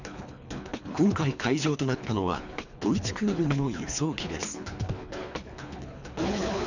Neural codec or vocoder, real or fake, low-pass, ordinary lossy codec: codec, 24 kHz, 3 kbps, HILCodec; fake; 7.2 kHz; none